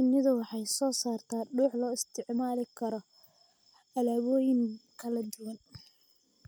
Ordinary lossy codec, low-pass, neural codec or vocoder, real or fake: none; none; none; real